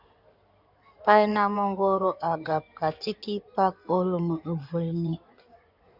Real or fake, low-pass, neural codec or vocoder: fake; 5.4 kHz; codec, 16 kHz in and 24 kHz out, 2.2 kbps, FireRedTTS-2 codec